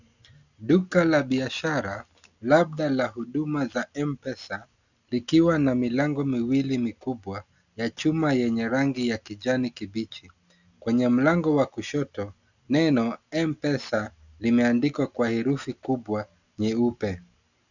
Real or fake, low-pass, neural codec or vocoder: real; 7.2 kHz; none